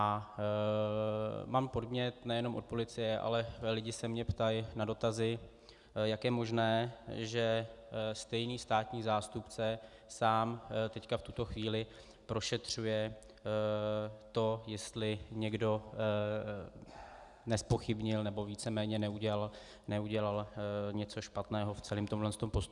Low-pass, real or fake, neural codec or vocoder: 10.8 kHz; real; none